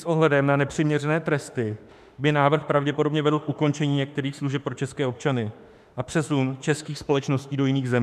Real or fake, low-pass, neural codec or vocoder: fake; 14.4 kHz; autoencoder, 48 kHz, 32 numbers a frame, DAC-VAE, trained on Japanese speech